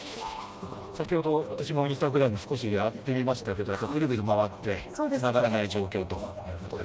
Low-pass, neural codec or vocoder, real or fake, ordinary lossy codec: none; codec, 16 kHz, 1 kbps, FreqCodec, smaller model; fake; none